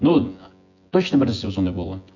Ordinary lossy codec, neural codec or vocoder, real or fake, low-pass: none; vocoder, 24 kHz, 100 mel bands, Vocos; fake; 7.2 kHz